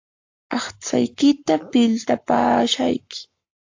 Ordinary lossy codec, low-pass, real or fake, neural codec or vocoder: AAC, 48 kbps; 7.2 kHz; fake; codec, 44.1 kHz, 7.8 kbps, Pupu-Codec